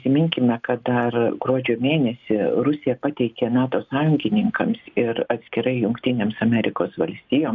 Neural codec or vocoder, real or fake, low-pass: none; real; 7.2 kHz